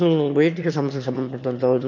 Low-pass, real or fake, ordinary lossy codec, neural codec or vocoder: 7.2 kHz; fake; none; autoencoder, 22.05 kHz, a latent of 192 numbers a frame, VITS, trained on one speaker